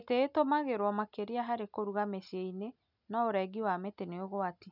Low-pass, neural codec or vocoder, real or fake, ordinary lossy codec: 5.4 kHz; none; real; none